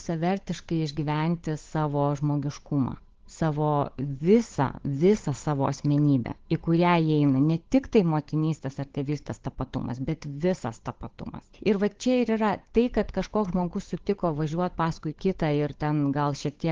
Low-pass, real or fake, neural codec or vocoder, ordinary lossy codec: 7.2 kHz; fake; codec, 16 kHz, 8 kbps, FunCodec, trained on Chinese and English, 25 frames a second; Opus, 16 kbps